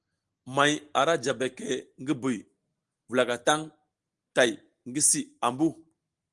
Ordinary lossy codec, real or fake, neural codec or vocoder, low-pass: Opus, 24 kbps; real; none; 10.8 kHz